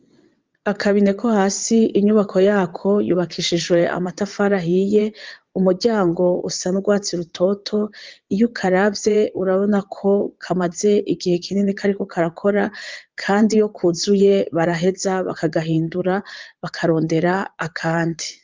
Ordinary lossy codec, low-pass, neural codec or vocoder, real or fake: Opus, 24 kbps; 7.2 kHz; vocoder, 22.05 kHz, 80 mel bands, WaveNeXt; fake